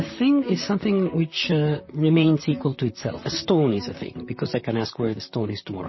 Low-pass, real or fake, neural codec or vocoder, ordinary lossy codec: 7.2 kHz; fake; vocoder, 44.1 kHz, 128 mel bands, Pupu-Vocoder; MP3, 24 kbps